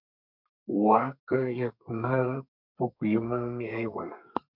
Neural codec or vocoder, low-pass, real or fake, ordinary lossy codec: codec, 32 kHz, 1.9 kbps, SNAC; 5.4 kHz; fake; MP3, 48 kbps